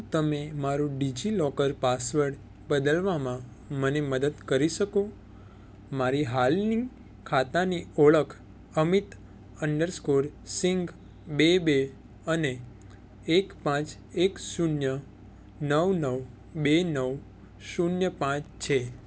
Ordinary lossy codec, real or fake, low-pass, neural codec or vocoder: none; real; none; none